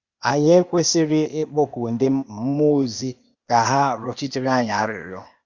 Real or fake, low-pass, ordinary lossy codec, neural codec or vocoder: fake; 7.2 kHz; Opus, 64 kbps; codec, 16 kHz, 0.8 kbps, ZipCodec